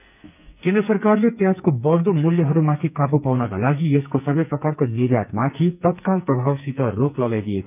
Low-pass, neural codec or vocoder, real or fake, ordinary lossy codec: 3.6 kHz; codec, 44.1 kHz, 2.6 kbps, SNAC; fake; none